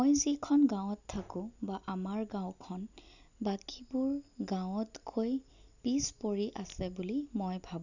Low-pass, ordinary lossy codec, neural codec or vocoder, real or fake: 7.2 kHz; none; none; real